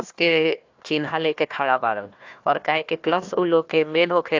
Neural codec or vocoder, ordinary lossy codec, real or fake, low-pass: codec, 16 kHz, 1 kbps, FunCodec, trained on Chinese and English, 50 frames a second; AAC, 48 kbps; fake; 7.2 kHz